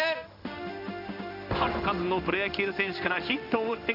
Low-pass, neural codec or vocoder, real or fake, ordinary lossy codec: 5.4 kHz; codec, 16 kHz in and 24 kHz out, 1 kbps, XY-Tokenizer; fake; none